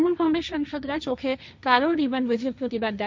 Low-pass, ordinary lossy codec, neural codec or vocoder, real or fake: none; none; codec, 16 kHz, 1.1 kbps, Voila-Tokenizer; fake